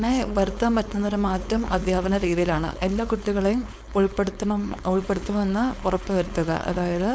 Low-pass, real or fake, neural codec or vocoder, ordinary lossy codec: none; fake; codec, 16 kHz, 4.8 kbps, FACodec; none